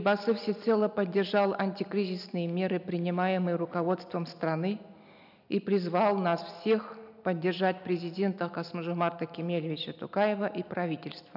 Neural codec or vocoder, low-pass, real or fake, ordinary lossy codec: none; 5.4 kHz; real; none